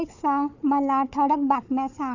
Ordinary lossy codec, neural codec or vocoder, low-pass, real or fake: none; codec, 16 kHz, 4 kbps, FunCodec, trained on Chinese and English, 50 frames a second; 7.2 kHz; fake